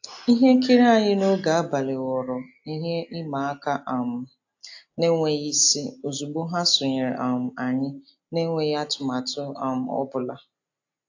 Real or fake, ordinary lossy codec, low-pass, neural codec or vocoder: real; AAC, 48 kbps; 7.2 kHz; none